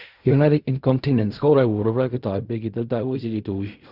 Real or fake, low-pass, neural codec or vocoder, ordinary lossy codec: fake; 5.4 kHz; codec, 16 kHz in and 24 kHz out, 0.4 kbps, LongCat-Audio-Codec, fine tuned four codebook decoder; Opus, 64 kbps